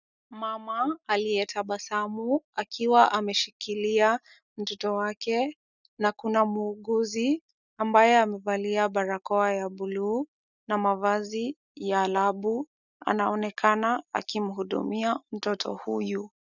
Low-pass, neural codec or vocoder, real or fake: 7.2 kHz; none; real